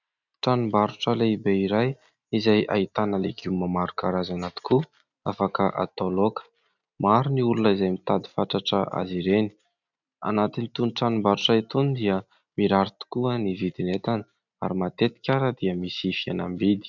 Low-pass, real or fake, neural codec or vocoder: 7.2 kHz; real; none